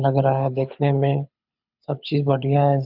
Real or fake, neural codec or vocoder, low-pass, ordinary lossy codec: fake; codec, 24 kHz, 6 kbps, HILCodec; 5.4 kHz; none